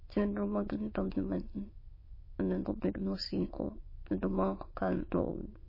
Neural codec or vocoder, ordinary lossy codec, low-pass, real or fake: autoencoder, 22.05 kHz, a latent of 192 numbers a frame, VITS, trained on many speakers; MP3, 24 kbps; 5.4 kHz; fake